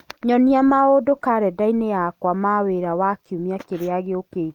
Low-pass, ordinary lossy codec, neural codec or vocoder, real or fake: 19.8 kHz; Opus, 32 kbps; none; real